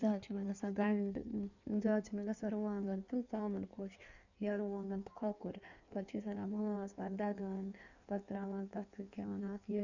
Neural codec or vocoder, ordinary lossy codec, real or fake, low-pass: codec, 16 kHz in and 24 kHz out, 1.1 kbps, FireRedTTS-2 codec; none; fake; 7.2 kHz